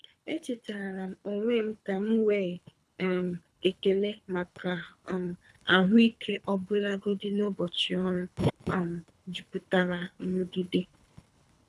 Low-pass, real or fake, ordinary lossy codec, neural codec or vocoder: none; fake; none; codec, 24 kHz, 3 kbps, HILCodec